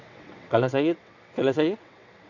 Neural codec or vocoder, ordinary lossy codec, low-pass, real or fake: vocoder, 22.05 kHz, 80 mel bands, WaveNeXt; none; 7.2 kHz; fake